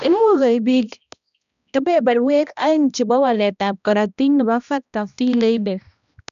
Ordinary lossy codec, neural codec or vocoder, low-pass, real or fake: none; codec, 16 kHz, 1 kbps, X-Codec, HuBERT features, trained on balanced general audio; 7.2 kHz; fake